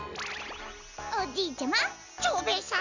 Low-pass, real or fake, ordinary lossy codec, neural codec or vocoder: 7.2 kHz; real; none; none